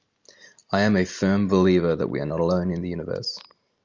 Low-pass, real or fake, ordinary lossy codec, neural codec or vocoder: 7.2 kHz; real; Opus, 32 kbps; none